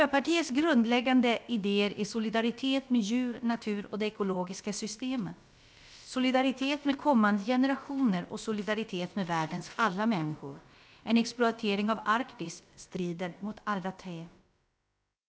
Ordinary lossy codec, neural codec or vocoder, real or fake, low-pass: none; codec, 16 kHz, about 1 kbps, DyCAST, with the encoder's durations; fake; none